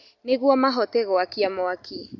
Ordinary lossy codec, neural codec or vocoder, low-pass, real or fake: none; none; 7.2 kHz; real